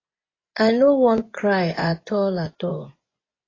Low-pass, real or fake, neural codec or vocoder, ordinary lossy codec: 7.2 kHz; fake; vocoder, 24 kHz, 100 mel bands, Vocos; AAC, 32 kbps